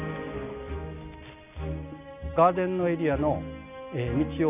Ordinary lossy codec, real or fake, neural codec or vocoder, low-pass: none; real; none; 3.6 kHz